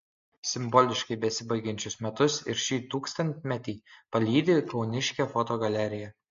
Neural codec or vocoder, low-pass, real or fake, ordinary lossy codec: none; 7.2 kHz; real; MP3, 48 kbps